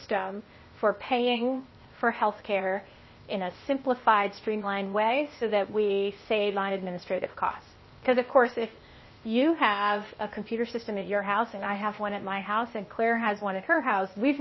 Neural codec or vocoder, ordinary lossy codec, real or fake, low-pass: codec, 16 kHz, 0.8 kbps, ZipCodec; MP3, 24 kbps; fake; 7.2 kHz